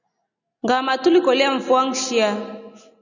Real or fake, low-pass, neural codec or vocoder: real; 7.2 kHz; none